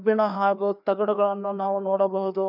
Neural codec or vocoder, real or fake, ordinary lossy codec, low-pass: codec, 16 kHz, 2 kbps, FreqCodec, larger model; fake; none; 5.4 kHz